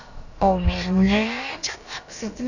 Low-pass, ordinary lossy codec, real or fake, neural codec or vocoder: 7.2 kHz; none; fake; codec, 16 kHz, about 1 kbps, DyCAST, with the encoder's durations